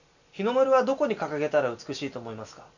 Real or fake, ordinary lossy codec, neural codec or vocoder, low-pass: real; none; none; 7.2 kHz